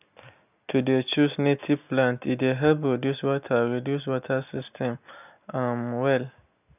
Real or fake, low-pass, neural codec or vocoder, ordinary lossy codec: real; 3.6 kHz; none; none